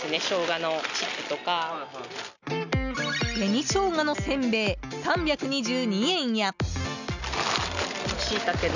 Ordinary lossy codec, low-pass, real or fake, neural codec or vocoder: none; 7.2 kHz; real; none